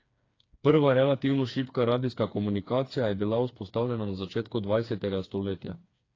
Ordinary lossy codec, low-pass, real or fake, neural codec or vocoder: AAC, 32 kbps; 7.2 kHz; fake; codec, 16 kHz, 4 kbps, FreqCodec, smaller model